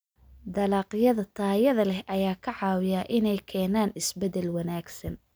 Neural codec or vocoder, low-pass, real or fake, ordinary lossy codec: none; none; real; none